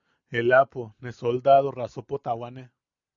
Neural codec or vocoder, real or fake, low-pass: none; real; 7.2 kHz